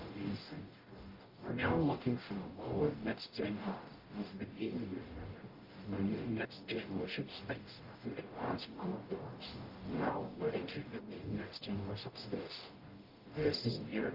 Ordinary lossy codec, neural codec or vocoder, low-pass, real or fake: Opus, 32 kbps; codec, 44.1 kHz, 0.9 kbps, DAC; 5.4 kHz; fake